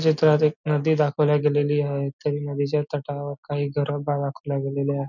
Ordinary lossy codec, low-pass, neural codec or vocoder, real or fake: none; 7.2 kHz; none; real